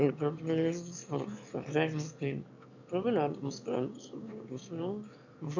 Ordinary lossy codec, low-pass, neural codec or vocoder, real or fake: none; 7.2 kHz; autoencoder, 22.05 kHz, a latent of 192 numbers a frame, VITS, trained on one speaker; fake